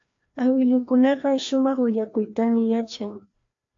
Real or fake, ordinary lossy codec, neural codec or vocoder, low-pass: fake; AAC, 48 kbps; codec, 16 kHz, 1 kbps, FreqCodec, larger model; 7.2 kHz